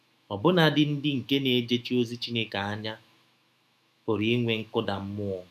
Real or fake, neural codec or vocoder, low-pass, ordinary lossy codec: fake; autoencoder, 48 kHz, 128 numbers a frame, DAC-VAE, trained on Japanese speech; 14.4 kHz; none